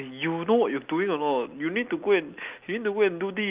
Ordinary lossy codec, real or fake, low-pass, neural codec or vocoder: Opus, 32 kbps; real; 3.6 kHz; none